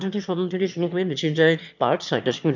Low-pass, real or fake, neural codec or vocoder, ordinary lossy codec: 7.2 kHz; fake; autoencoder, 22.05 kHz, a latent of 192 numbers a frame, VITS, trained on one speaker; MP3, 64 kbps